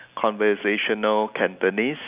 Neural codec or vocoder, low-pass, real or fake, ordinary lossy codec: none; 3.6 kHz; real; Opus, 64 kbps